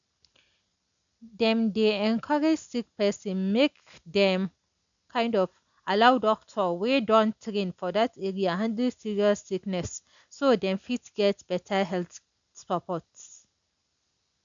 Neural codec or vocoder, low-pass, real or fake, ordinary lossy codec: none; 7.2 kHz; real; none